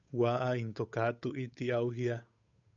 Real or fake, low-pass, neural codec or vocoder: fake; 7.2 kHz; codec, 16 kHz, 8 kbps, FunCodec, trained on Chinese and English, 25 frames a second